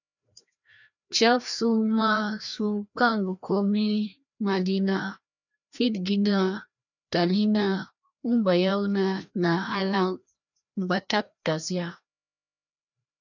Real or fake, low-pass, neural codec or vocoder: fake; 7.2 kHz; codec, 16 kHz, 1 kbps, FreqCodec, larger model